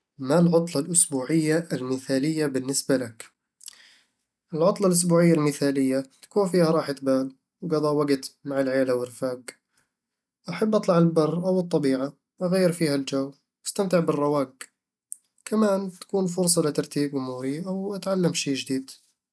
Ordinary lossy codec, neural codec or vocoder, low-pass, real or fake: none; none; none; real